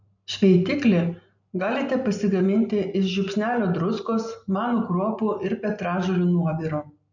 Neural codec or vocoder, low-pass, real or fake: none; 7.2 kHz; real